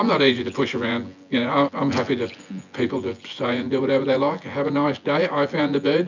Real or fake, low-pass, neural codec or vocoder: fake; 7.2 kHz; vocoder, 24 kHz, 100 mel bands, Vocos